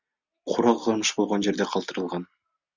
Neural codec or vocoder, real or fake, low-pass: none; real; 7.2 kHz